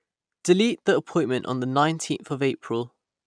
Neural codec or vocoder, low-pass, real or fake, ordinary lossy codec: none; 9.9 kHz; real; none